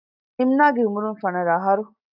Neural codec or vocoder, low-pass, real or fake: none; 5.4 kHz; real